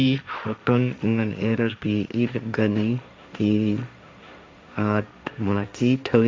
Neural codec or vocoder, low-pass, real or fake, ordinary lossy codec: codec, 16 kHz, 1.1 kbps, Voila-Tokenizer; none; fake; none